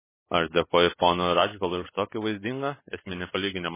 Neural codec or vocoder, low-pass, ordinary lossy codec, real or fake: none; 3.6 kHz; MP3, 16 kbps; real